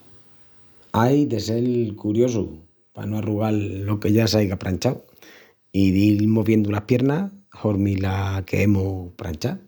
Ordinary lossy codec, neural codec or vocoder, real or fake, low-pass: none; none; real; none